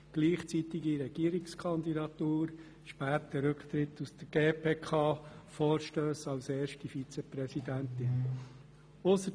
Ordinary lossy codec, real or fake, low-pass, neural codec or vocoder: none; real; none; none